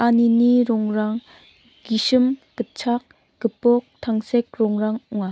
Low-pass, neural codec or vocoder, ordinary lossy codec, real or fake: none; none; none; real